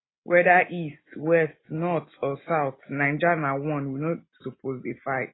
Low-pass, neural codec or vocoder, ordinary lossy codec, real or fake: 7.2 kHz; none; AAC, 16 kbps; real